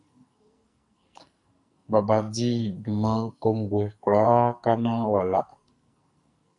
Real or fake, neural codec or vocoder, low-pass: fake; codec, 44.1 kHz, 2.6 kbps, SNAC; 10.8 kHz